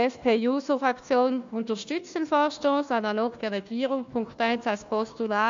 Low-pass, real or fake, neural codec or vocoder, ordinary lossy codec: 7.2 kHz; fake; codec, 16 kHz, 1 kbps, FunCodec, trained on Chinese and English, 50 frames a second; AAC, 64 kbps